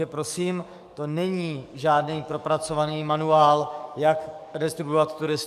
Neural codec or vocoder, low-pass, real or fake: codec, 44.1 kHz, 7.8 kbps, DAC; 14.4 kHz; fake